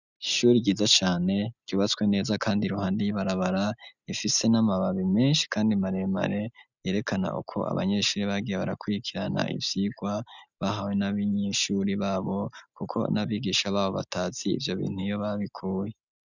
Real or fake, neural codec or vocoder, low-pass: real; none; 7.2 kHz